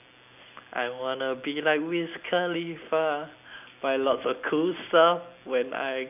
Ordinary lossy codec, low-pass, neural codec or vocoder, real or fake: none; 3.6 kHz; none; real